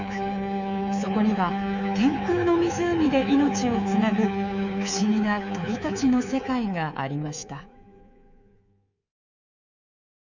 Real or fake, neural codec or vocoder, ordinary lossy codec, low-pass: fake; codec, 24 kHz, 3.1 kbps, DualCodec; none; 7.2 kHz